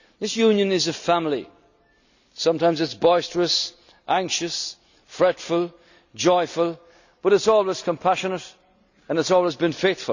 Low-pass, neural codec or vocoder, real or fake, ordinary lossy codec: 7.2 kHz; none; real; none